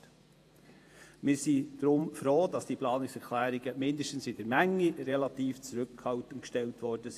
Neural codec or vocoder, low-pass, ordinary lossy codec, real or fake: vocoder, 48 kHz, 128 mel bands, Vocos; 14.4 kHz; AAC, 64 kbps; fake